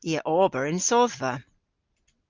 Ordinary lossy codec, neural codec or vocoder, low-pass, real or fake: Opus, 24 kbps; none; 7.2 kHz; real